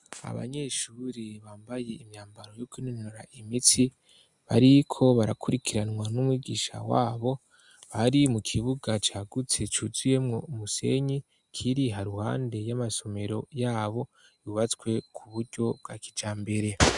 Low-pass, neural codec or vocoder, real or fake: 10.8 kHz; none; real